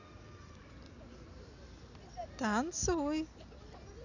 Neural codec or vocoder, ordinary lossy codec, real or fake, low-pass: none; none; real; 7.2 kHz